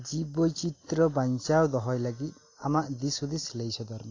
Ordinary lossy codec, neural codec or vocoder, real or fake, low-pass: AAC, 32 kbps; none; real; 7.2 kHz